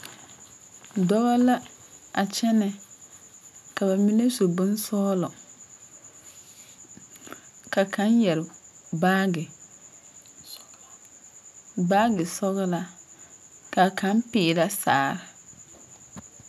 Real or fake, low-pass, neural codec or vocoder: real; 14.4 kHz; none